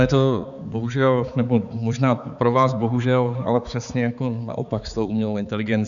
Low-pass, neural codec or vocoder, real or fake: 7.2 kHz; codec, 16 kHz, 4 kbps, X-Codec, HuBERT features, trained on balanced general audio; fake